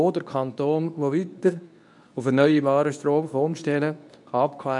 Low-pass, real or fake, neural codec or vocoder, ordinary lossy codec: 10.8 kHz; fake; codec, 24 kHz, 0.9 kbps, WavTokenizer, medium speech release version 2; none